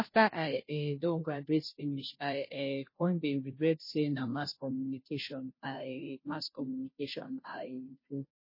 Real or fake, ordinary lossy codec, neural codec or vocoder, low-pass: fake; MP3, 24 kbps; codec, 16 kHz, 0.5 kbps, FunCodec, trained on Chinese and English, 25 frames a second; 5.4 kHz